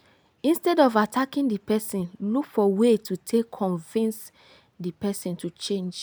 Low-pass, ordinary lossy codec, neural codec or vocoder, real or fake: none; none; none; real